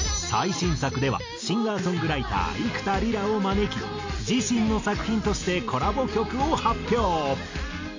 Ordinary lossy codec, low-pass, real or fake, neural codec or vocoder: AAC, 48 kbps; 7.2 kHz; real; none